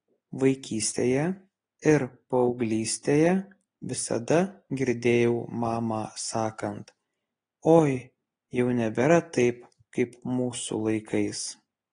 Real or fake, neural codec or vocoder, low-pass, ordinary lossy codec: real; none; 9.9 kHz; AAC, 32 kbps